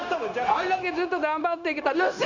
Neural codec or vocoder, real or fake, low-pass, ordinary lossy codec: codec, 16 kHz, 0.9 kbps, LongCat-Audio-Codec; fake; 7.2 kHz; none